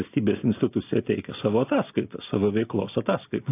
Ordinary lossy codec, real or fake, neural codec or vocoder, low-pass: AAC, 24 kbps; real; none; 3.6 kHz